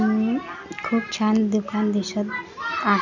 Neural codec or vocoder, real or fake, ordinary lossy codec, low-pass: none; real; none; 7.2 kHz